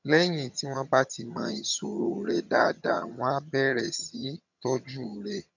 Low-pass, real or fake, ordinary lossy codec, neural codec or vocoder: 7.2 kHz; fake; none; vocoder, 22.05 kHz, 80 mel bands, HiFi-GAN